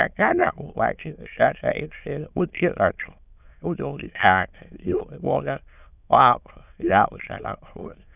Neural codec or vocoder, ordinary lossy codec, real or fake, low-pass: autoencoder, 22.05 kHz, a latent of 192 numbers a frame, VITS, trained on many speakers; none; fake; 3.6 kHz